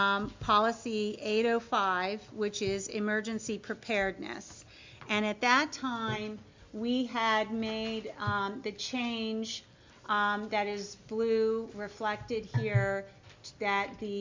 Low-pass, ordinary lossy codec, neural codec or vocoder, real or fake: 7.2 kHz; MP3, 64 kbps; none; real